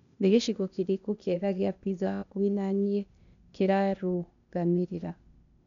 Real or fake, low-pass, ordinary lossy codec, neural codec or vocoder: fake; 7.2 kHz; none; codec, 16 kHz, 0.8 kbps, ZipCodec